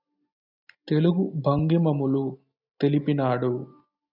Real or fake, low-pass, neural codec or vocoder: real; 5.4 kHz; none